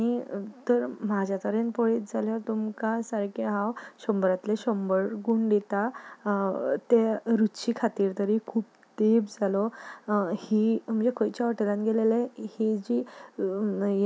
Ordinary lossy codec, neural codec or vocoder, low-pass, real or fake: none; none; none; real